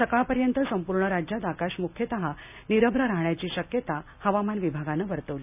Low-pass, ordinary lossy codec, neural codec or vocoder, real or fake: 3.6 kHz; none; none; real